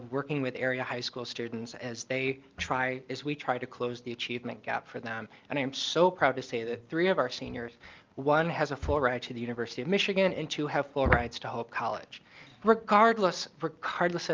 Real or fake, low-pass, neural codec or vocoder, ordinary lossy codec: real; 7.2 kHz; none; Opus, 24 kbps